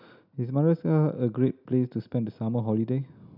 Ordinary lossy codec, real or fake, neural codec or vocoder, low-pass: none; real; none; 5.4 kHz